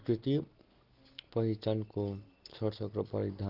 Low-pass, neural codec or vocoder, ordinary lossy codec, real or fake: 5.4 kHz; none; Opus, 16 kbps; real